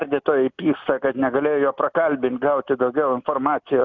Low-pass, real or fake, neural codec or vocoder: 7.2 kHz; real; none